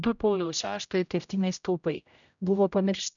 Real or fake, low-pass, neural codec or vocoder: fake; 7.2 kHz; codec, 16 kHz, 0.5 kbps, X-Codec, HuBERT features, trained on general audio